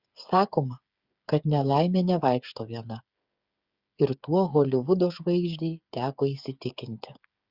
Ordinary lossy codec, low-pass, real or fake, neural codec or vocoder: Opus, 64 kbps; 5.4 kHz; fake; codec, 16 kHz, 8 kbps, FreqCodec, smaller model